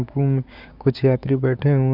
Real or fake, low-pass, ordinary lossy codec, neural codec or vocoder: fake; 5.4 kHz; none; codec, 16 kHz, 6 kbps, DAC